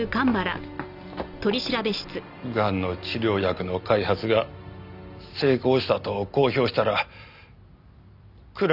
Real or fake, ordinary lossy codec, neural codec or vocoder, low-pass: real; none; none; 5.4 kHz